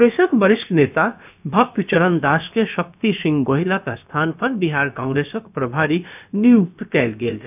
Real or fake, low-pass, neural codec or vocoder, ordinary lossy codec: fake; 3.6 kHz; codec, 16 kHz, about 1 kbps, DyCAST, with the encoder's durations; none